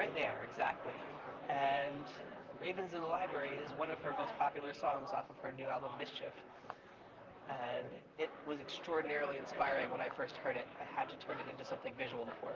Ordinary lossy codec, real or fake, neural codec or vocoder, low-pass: Opus, 24 kbps; fake; vocoder, 44.1 kHz, 128 mel bands, Pupu-Vocoder; 7.2 kHz